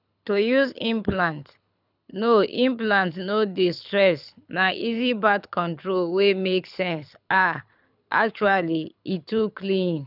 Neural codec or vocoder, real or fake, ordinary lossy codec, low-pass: codec, 24 kHz, 6 kbps, HILCodec; fake; none; 5.4 kHz